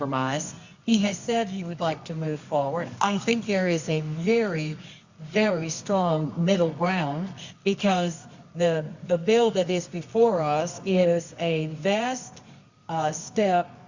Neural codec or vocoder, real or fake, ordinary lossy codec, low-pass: codec, 24 kHz, 0.9 kbps, WavTokenizer, medium music audio release; fake; Opus, 64 kbps; 7.2 kHz